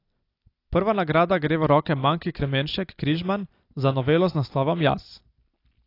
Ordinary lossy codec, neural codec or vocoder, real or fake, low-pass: AAC, 32 kbps; none; real; 5.4 kHz